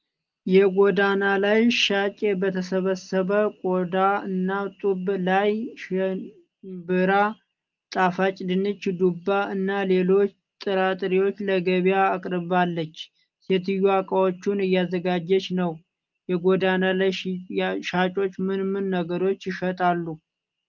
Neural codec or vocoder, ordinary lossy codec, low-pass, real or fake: none; Opus, 24 kbps; 7.2 kHz; real